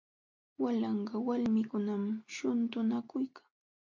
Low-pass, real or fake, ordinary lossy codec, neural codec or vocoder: 7.2 kHz; real; MP3, 48 kbps; none